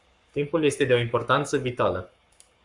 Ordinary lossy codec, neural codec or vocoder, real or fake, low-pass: Opus, 64 kbps; codec, 44.1 kHz, 7.8 kbps, Pupu-Codec; fake; 10.8 kHz